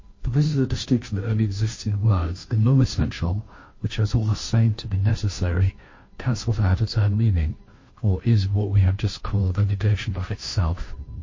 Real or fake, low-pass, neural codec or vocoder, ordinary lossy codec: fake; 7.2 kHz; codec, 16 kHz, 0.5 kbps, FunCodec, trained on Chinese and English, 25 frames a second; MP3, 32 kbps